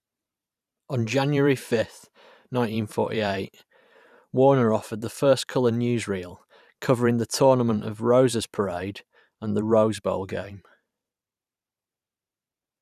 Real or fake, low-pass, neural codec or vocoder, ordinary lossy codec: fake; 14.4 kHz; vocoder, 44.1 kHz, 128 mel bands every 512 samples, BigVGAN v2; none